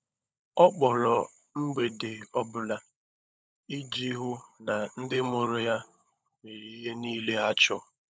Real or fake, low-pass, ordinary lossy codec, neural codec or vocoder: fake; none; none; codec, 16 kHz, 16 kbps, FunCodec, trained on LibriTTS, 50 frames a second